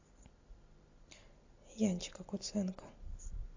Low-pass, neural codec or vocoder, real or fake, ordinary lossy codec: 7.2 kHz; none; real; AAC, 48 kbps